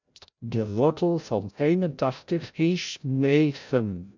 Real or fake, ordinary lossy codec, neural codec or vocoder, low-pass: fake; MP3, 64 kbps; codec, 16 kHz, 0.5 kbps, FreqCodec, larger model; 7.2 kHz